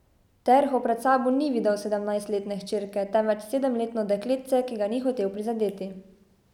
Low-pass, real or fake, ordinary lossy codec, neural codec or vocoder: 19.8 kHz; real; none; none